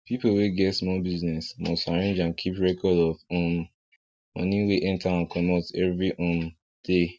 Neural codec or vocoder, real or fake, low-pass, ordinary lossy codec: none; real; none; none